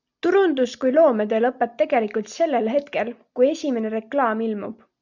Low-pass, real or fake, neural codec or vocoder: 7.2 kHz; real; none